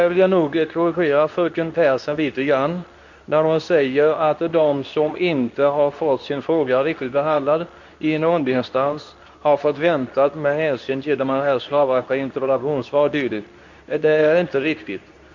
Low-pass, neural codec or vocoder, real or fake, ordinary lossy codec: 7.2 kHz; codec, 24 kHz, 0.9 kbps, WavTokenizer, medium speech release version 2; fake; none